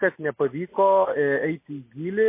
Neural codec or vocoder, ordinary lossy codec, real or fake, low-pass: none; MP3, 24 kbps; real; 3.6 kHz